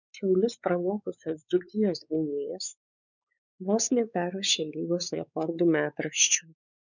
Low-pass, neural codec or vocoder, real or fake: 7.2 kHz; codec, 16 kHz, 4 kbps, X-Codec, WavLM features, trained on Multilingual LibriSpeech; fake